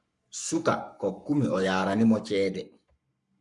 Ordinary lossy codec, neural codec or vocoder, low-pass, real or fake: MP3, 96 kbps; codec, 44.1 kHz, 7.8 kbps, Pupu-Codec; 10.8 kHz; fake